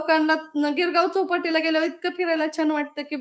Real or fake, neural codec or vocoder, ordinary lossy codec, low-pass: fake; codec, 16 kHz, 6 kbps, DAC; none; none